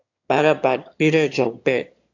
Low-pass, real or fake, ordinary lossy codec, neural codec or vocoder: 7.2 kHz; fake; AAC, 48 kbps; autoencoder, 22.05 kHz, a latent of 192 numbers a frame, VITS, trained on one speaker